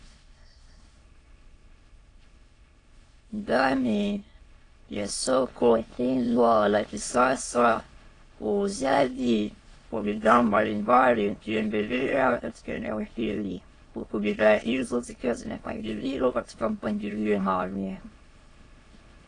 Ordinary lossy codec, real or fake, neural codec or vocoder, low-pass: AAC, 32 kbps; fake; autoencoder, 22.05 kHz, a latent of 192 numbers a frame, VITS, trained on many speakers; 9.9 kHz